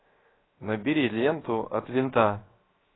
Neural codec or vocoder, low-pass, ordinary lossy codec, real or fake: codec, 16 kHz, 0.7 kbps, FocalCodec; 7.2 kHz; AAC, 16 kbps; fake